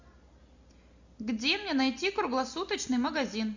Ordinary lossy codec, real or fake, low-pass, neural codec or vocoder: Opus, 64 kbps; real; 7.2 kHz; none